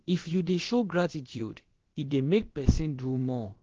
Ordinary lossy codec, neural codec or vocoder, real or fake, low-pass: Opus, 16 kbps; codec, 16 kHz, about 1 kbps, DyCAST, with the encoder's durations; fake; 7.2 kHz